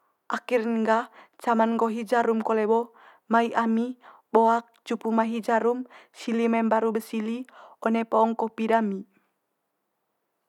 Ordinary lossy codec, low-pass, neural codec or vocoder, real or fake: none; 19.8 kHz; none; real